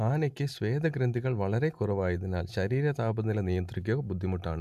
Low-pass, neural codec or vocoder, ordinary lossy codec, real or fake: 14.4 kHz; none; AAC, 96 kbps; real